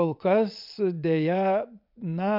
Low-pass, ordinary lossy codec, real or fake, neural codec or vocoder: 5.4 kHz; MP3, 48 kbps; fake; vocoder, 22.05 kHz, 80 mel bands, WaveNeXt